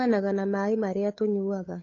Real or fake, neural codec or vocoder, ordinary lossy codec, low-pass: fake; codec, 16 kHz, 8 kbps, FunCodec, trained on Chinese and English, 25 frames a second; AAC, 32 kbps; 7.2 kHz